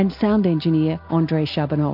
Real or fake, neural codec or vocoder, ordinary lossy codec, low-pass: real; none; MP3, 48 kbps; 5.4 kHz